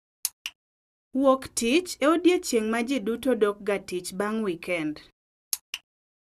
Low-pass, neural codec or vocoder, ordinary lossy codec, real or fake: 14.4 kHz; none; Opus, 64 kbps; real